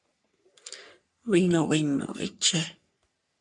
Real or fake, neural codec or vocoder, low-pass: fake; codec, 44.1 kHz, 3.4 kbps, Pupu-Codec; 10.8 kHz